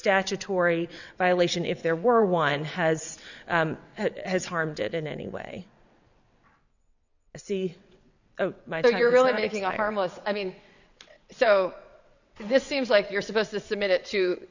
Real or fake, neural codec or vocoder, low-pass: fake; vocoder, 22.05 kHz, 80 mel bands, WaveNeXt; 7.2 kHz